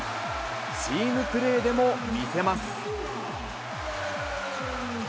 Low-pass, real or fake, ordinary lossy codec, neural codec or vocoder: none; real; none; none